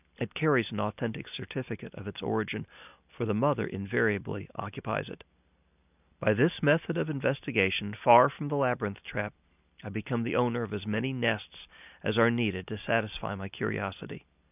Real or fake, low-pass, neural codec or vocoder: real; 3.6 kHz; none